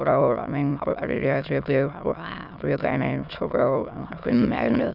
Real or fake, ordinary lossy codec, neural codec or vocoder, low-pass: fake; none; autoencoder, 22.05 kHz, a latent of 192 numbers a frame, VITS, trained on many speakers; 5.4 kHz